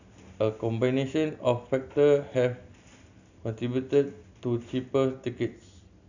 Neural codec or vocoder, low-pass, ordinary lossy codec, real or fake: none; 7.2 kHz; none; real